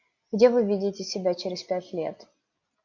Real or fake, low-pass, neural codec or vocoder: real; 7.2 kHz; none